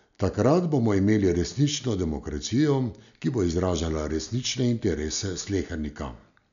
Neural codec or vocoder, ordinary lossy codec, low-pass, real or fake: none; none; 7.2 kHz; real